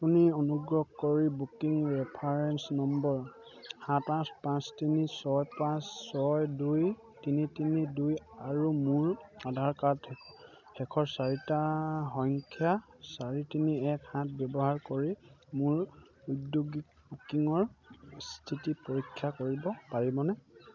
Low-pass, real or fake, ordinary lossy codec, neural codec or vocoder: 7.2 kHz; real; none; none